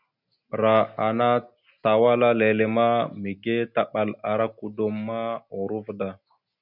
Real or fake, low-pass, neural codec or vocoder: real; 5.4 kHz; none